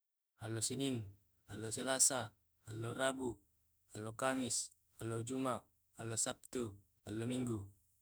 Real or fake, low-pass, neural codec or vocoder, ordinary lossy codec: fake; none; autoencoder, 48 kHz, 32 numbers a frame, DAC-VAE, trained on Japanese speech; none